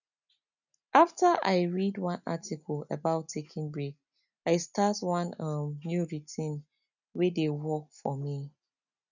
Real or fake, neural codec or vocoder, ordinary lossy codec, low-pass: real; none; none; 7.2 kHz